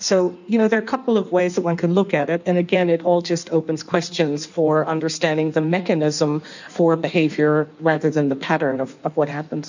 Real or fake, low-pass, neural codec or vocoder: fake; 7.2 kHz; codec, 16 kHz in and 24 kHz out, 1.1 kbps, FireRedTTS-2 codec